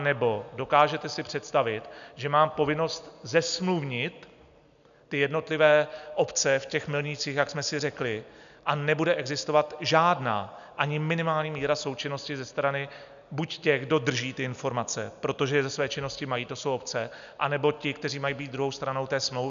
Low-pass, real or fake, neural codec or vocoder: 7.2 kHz; real; none